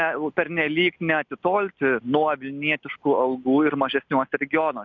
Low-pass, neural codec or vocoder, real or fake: 7.2 kHz; none; real